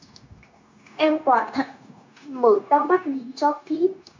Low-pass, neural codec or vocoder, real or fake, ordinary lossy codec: 7.2 kHz; codec, 16 kHz, 0.9 kbps, LongCat-Audio-Codec; fake; MP3, 64 kbps